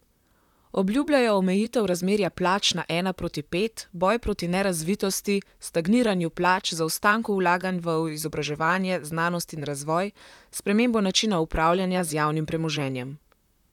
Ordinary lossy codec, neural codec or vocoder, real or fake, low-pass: none; vocoder, 44.1 kHz, 128 mel bands, Pupu-Vocoder; fake; 19.8 kHz